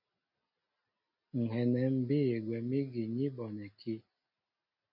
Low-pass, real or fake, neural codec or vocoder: 5.4 kHz; real; none